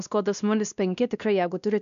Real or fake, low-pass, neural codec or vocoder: fake; 7.2 kHz; codec, 16 kHz, 1 kbps, X-Codec, WavLM features, trained on Multilingual LibriSpeech